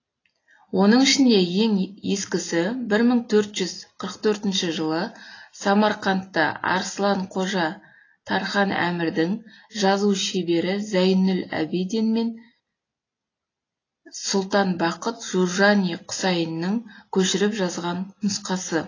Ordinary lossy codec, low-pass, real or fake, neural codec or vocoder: AAC, 32 kbps; 7.2 kHz; real; none